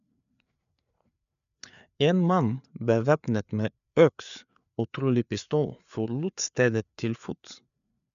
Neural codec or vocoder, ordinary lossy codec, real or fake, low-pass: codec, 16 kHz, 4 kbps, FreqCodec, larger model; none; fake; 7.2 kHz